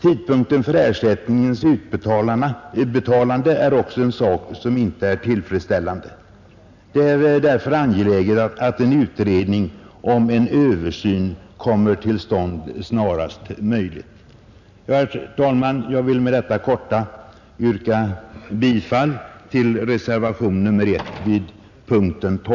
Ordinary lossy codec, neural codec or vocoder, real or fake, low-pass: none; none; real; 7.2 kHz